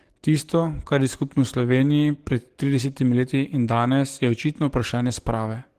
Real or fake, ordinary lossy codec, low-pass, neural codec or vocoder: fake; Opus, 16 kbps; 14.4 kHz; codec, 44.1 kHz, 7.8 kbps, DAC